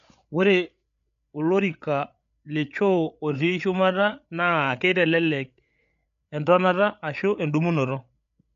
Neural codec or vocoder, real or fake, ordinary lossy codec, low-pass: codec, 16 kHz, 8 kbps, FreqCodec, larger model; fake; none; 7.2 kHz